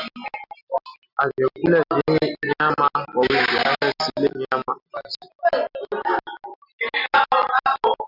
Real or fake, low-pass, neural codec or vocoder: real; 5.4 kHz; none